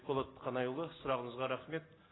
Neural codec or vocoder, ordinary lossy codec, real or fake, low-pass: none; AAC, 16 kbps; real; 7.2 kHz